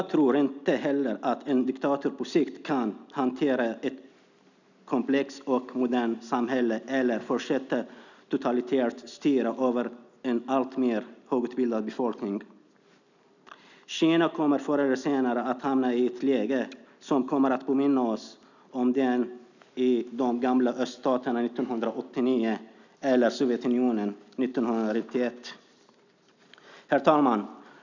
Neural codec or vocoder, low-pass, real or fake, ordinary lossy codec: none; 7.2 kHz; real; none